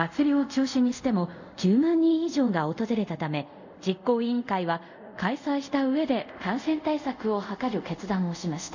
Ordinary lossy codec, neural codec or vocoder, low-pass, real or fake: none; codec, 24 kHz, 0.5 kbps, DualCodec; 7.2 kHz; fake